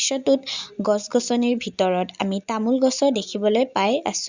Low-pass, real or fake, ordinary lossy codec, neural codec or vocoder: 7.2 kHz; real; Opus, 64 kbps; none